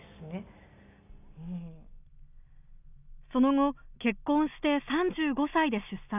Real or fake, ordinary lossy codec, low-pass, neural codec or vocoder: real; none; 3.6 kHz; none